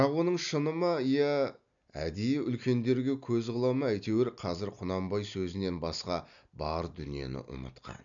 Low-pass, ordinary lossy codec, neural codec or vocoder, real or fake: 7.2 kHz; none; none; real